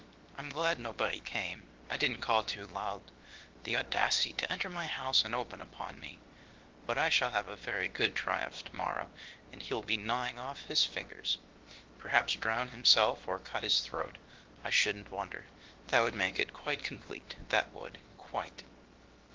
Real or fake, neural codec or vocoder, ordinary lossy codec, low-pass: fake; codec, 16 kHz, about 1 kbps, DyCAST, with the encoder's durations; Opus, 16 kbps; 7.2 kHz